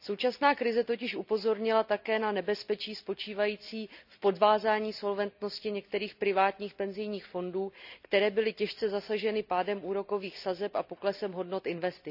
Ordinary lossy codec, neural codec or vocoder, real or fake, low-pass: none; none; real; 5.4 kHz